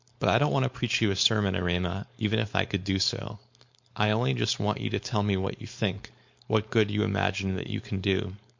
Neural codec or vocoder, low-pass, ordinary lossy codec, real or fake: codec, 16 kHz, 4.8 kbps, FACodec; 7.2 kHz; MP3, 48 kbps; fake